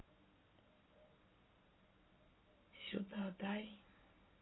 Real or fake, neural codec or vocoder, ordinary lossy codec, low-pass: real; none; AAC, 16 kbps; 7.2 kHz